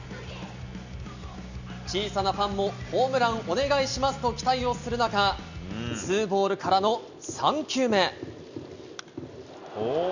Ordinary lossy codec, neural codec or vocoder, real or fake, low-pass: none; none; real; 7.2 kHz